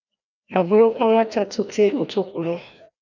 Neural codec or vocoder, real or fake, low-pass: codec, 16 kHz, 1 kbps, FreqCodec, larger model; fake; 7.2 kHz